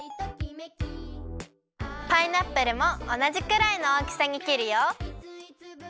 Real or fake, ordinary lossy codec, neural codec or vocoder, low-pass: real; none; none; none